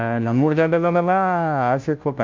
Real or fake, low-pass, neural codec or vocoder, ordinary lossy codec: fake; 7.2 kHz; codec, 16 kHz, 0.5 kbps, FunCodec, trained on Chinese and English, 25 frames a second; MP3, 64 kbps